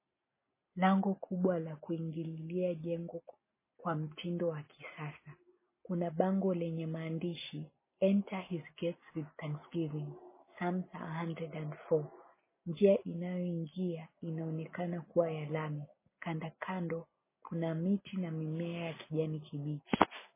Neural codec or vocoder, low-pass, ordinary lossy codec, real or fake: none; 3.6 kHz; MP3, 16 kbps; real